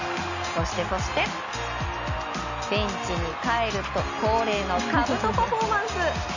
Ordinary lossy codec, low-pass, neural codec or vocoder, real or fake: none; 7.2 kHz; none; real